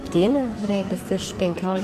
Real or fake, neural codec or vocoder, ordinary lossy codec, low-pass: fake; codec, 44.1 kHz, 2.6 kbps, SNAC; MP3, 64 kbps; 14.4 kHz